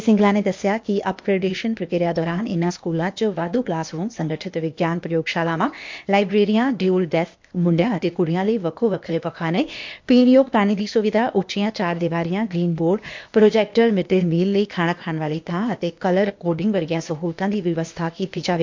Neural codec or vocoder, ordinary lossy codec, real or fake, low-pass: codec, 16 kHz, 0.8 kbps, ZipCodec; MP3, 48 kbps; fake; 7.2 kHz